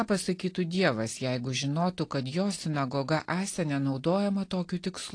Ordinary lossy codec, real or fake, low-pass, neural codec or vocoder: AAC, 48 kbps; real; 9.9 kHz; none